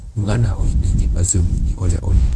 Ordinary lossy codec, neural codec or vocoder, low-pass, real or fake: none; codec, 24 kHz, 0.9 kbps, WavTokenizer, medium speech release version 1; none; fake